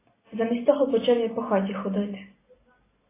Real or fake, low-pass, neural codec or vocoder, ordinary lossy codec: real; 3.6 kHz; none; AAC, 16 kbps